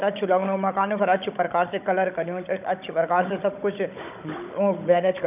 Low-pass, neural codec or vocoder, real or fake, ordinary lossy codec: 3.6 kHz; codec, 16 kHz, 16 kbps, FunCodec, trained on Chinese and English, 50 frames a second; fake; none